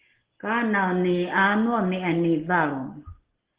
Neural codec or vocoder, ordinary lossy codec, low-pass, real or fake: none; Opus, 16 kbps; 3.6 kHz; real